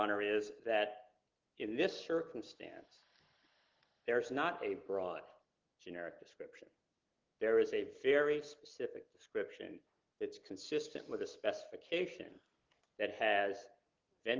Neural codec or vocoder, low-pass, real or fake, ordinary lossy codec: none; 7.2 kHz; real; Opus, 16 kbps